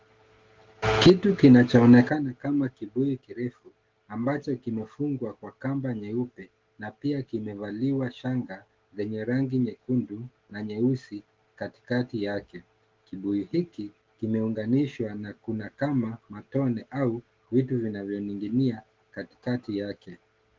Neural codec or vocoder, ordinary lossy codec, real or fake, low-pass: none; Opus, 16 kbps; real; 7.2 kHz